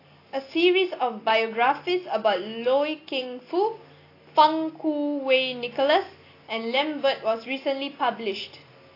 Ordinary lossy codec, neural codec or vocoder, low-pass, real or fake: AAC, 32 kbps; none; 5.4 kHz; real